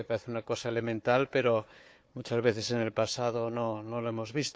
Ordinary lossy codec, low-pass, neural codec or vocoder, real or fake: none; none; codec, 16 kHz, 4 kbps, FunCodec, trained on Chinese and English, 50 frames a second; fake